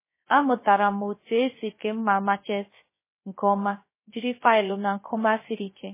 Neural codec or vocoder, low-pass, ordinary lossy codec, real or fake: codec, 16 kHz, 0.3 kbps, FocalCodec; 3.6 kHz; MP3, 16 kbps; fake